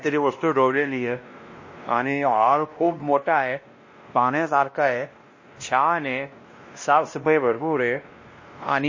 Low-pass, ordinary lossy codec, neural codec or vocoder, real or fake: 7.2 kHz; MP3, 32 kbps; codec, 16 kHz, 1 kbps, X-Codec, WavLM features, trained on Multilingual LibriSpeech; fake